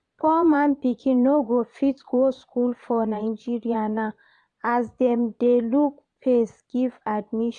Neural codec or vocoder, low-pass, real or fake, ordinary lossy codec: vocoder, 22.05 kHz, 80 mel bands, Vocos; 9.9 kHz; fake; none